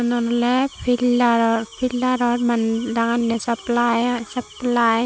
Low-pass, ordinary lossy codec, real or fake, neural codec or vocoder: none; none; real; none